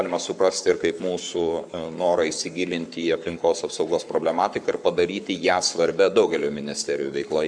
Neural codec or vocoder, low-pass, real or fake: codec, 44.1 kHz, 7.8 kbps, Pupu-Codec; 9.9 kHz; fake